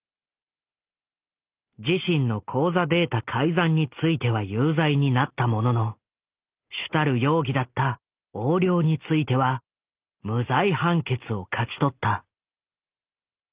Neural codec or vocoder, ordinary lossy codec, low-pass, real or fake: none; Opus, 32 kbps; 3.6 kHz; real